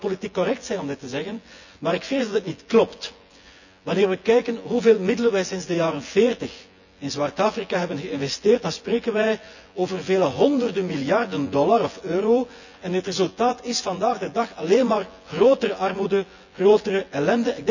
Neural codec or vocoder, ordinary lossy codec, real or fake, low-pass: vocoder, 24 kHz, 100 mel bands, Vocos; none; fake; 7.2 kHz